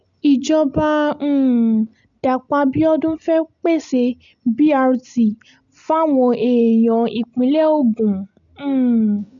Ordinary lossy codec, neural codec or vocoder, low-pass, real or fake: none; none; 7.2 kHz; real